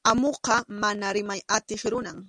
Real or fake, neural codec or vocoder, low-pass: real; none; 9.9 kHz